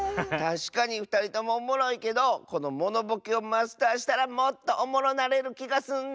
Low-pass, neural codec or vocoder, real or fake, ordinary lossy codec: none; none; real; none